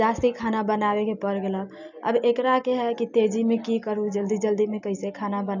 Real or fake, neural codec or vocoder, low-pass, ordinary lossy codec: real; none; 7.2 kHz; none